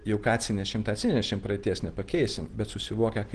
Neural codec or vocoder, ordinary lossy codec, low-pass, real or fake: none; Opus, 16 kbps; 9.9 kHz; real